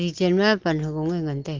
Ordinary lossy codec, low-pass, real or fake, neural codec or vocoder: Opus, 16 kbps; 7.2 kHz; real; none